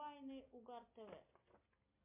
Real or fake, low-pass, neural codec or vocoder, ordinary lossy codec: real; 3.6 kHz; none; MP3, 32 kbps